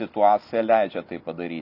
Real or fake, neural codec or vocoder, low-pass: real; none; 5.4 kHz